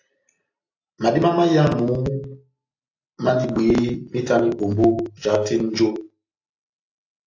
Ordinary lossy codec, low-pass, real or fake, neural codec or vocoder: AAC, 48 kbps; 7.2 kHz; real; none